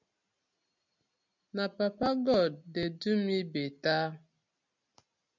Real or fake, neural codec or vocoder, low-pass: real; none; 7.2 kHz